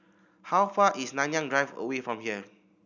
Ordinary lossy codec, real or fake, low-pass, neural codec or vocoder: none; real; 7.2 kHz; none